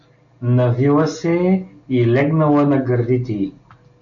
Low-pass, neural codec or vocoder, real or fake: 7.2 kHz; none; real